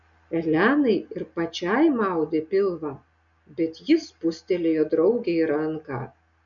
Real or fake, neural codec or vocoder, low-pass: real; none; 7.2 kHz